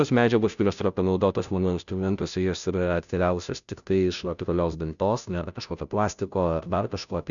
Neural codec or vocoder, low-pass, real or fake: codec, 16 kHz, 0.5 kbps, FunCodec, trained on Chinese and English, 25 frames a second; 7.2 kHz; fake